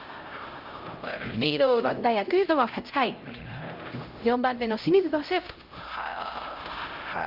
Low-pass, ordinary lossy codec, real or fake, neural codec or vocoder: 5.4 kHz; Opus, 24 kbps; fake; codec, 16 kHz, 0.5 kbps, X-Codec, HuBERT features, trained on LibriSpeech